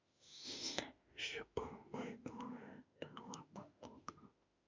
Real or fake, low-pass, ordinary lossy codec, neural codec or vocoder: fake; 7.2 kHz; MP3, 64 kbps; autoencoder, 48 kHz, 32 numbers a frame, DAC-VAE, trained on Japanese speech